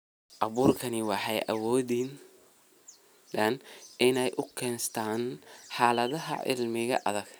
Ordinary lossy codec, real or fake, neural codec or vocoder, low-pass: none; real; none; none